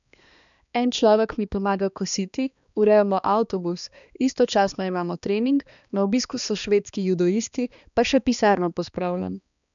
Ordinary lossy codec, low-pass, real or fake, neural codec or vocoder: none; 7.2 kHz; fake; codec, 16 kHz, 2 kbps, X-Codec, HuBERT features, trained on balanced general audio